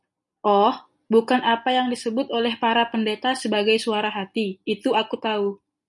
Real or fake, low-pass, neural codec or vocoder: real; 10.8 kHz; none